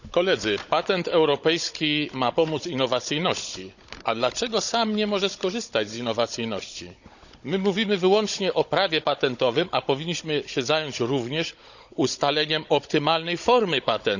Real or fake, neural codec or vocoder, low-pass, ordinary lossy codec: fake; codec, 16 kHz, 16 kbps, FunCodec, trained on Chinese and English, 50 frames a second; 7.2 kHz; none